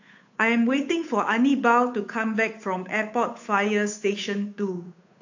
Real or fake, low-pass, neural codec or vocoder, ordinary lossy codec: fake; 7.2 kHz; codec, 16 kHz, 8 kbps, FunCodec, trained on Chinese and English, 25 frames a second; AAC, 48 kbps